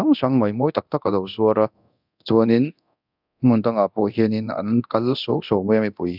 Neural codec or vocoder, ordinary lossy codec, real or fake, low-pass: codec, 24 kHz, 0.9 kbps, DualCodec; none; fake; 5.4 kHz